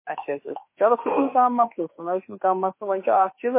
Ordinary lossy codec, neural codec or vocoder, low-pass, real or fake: MP3, 24 kbps; autoencoder, 48 kHz, 32 numbers a frame, DAC-VAE, trained on Japanese speech; 3.6 kHz; fake